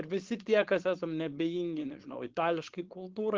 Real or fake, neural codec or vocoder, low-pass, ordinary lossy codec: fake; codec, 16 kHz, 4.8 kbps, FACodec; 7.2 kHz; Opus, 16 kbps